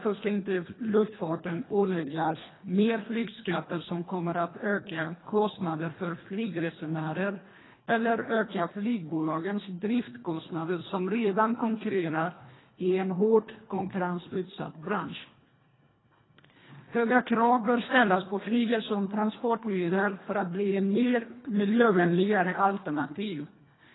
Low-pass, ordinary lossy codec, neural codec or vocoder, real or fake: 7.2 kHz; AAC, 16 kbps; codec, 24 kHz, 1.5 kbps, HILCodec; fake